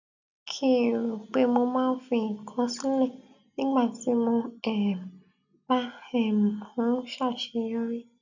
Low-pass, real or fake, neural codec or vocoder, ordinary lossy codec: 7.2 kHz; real; none; none